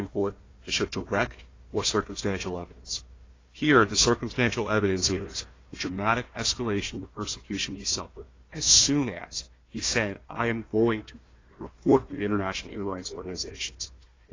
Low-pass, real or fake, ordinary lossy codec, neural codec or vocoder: 7.2 kHz; fake; AAC, 32 kbps; codec, 16 kHz, 1 kbps, FunCodec, trained on Chinese and English, 50 frames a second